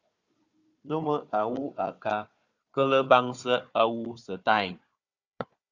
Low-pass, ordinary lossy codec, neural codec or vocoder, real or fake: 7.2 kHz; Opus, 64 kbps; codec, 16 kHz, 4 kbps, FunCodec, trained on Chinese and English, 50 frames a second; fake